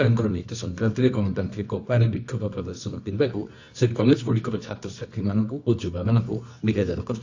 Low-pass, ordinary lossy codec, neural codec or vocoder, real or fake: 7.2 kHz; AAC, 48 kbps; codec, 24 kHz, 0.9 kbps, WavTokenizer, medium music audio release; fake